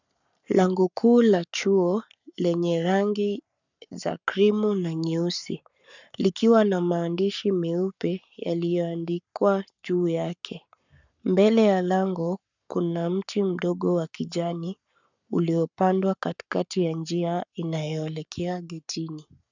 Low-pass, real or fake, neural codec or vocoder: 7.2 kHz; fake; codec, 44.1 kHz, 7.8 kbps, Pupu-Codec